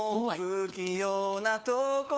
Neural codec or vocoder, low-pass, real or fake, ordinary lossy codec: codec, 16 kHz, 4 kbps, FunCodec, trained on LibriTTS, 50 frames a second; none; fake; none